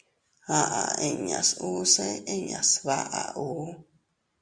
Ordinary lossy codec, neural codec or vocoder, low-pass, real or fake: Opus, 64 kbps; none; 9.9 kHz; real